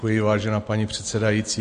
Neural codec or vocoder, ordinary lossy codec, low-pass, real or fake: none; MP3, 48 kbps; 9.9 kHz; real